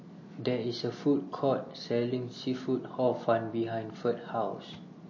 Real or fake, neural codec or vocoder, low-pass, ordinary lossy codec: real; none; 7.2 kHz; MP3, 32 kbps